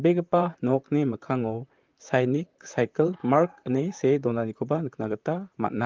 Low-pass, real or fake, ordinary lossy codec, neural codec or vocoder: 7.2 kHz; fake; Opus, 32 kbps; vocoder, 44.1 kHz, 128 mel bands, Pupu-Vocoder